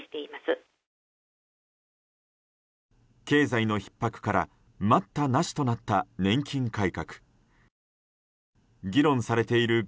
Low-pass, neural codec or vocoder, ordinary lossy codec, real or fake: none; none; none; real